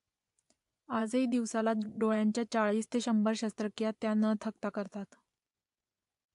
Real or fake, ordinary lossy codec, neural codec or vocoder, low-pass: fake; AAC, 64 kbps; vocoder, 24 kHz, 100 mel bands, Vocos; 10.8 kHz